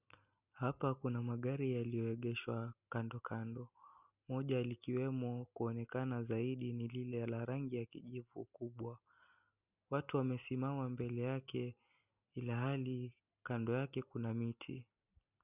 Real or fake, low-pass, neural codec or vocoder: real; 3.6 kHz; none